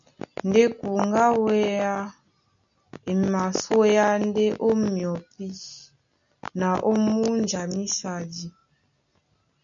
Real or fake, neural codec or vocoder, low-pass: real; none; 7.2 kHz